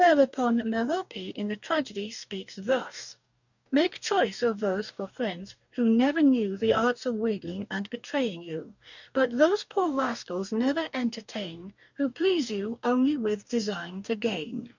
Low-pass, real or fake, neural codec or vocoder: 7.2 kHz; fake; codec, 44.1 kHz, 2.6 kbps, DAC